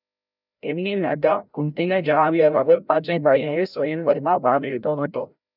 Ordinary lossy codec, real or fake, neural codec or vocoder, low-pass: none; fake; codec, 16 kHz, 0.5 kbps, FreqCodec, larger model; 5.4 kHz